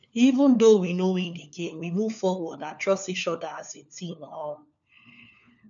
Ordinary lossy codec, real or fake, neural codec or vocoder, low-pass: none; fake; codec, 16 kHz, 4 kbps, FunCodec, trained on LibriTTS, 50 frames a second; 7.2 kHz